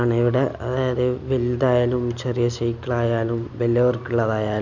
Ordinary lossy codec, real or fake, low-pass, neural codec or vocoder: none; real; 7.2 kHz; none